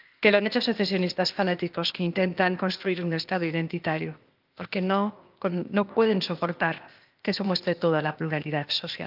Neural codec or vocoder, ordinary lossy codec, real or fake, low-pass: codec, 16 kHz, 0.8 kbps, ZipCodec; Opus, 24 kbps; fake; 5.4 kHz